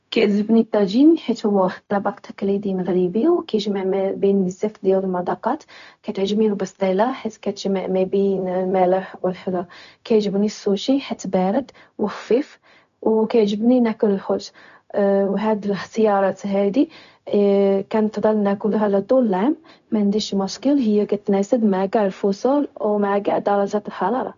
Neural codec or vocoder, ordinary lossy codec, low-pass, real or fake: codec, 16 kHz, 0.4 kbps, LongCat-Audio-Codec; none; 7.2 kHz; fake